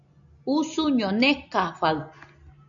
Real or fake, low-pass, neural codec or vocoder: real; 7.2 kHz; none